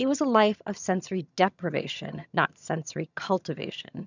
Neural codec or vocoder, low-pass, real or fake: vocoder, 22.05 kHz, 80 mel bands, HiFi-GAN; 7.2 kHz; fake